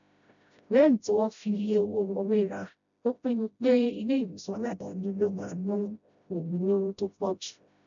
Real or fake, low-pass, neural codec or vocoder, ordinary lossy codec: fake; 7.2 kHz; codec, 16 kHz, 0.5 kbps, FreqCodec, smaller model; none